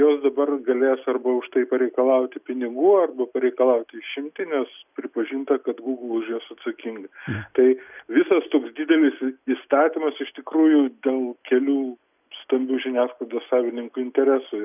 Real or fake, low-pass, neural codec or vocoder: real; 3.6 kHz; none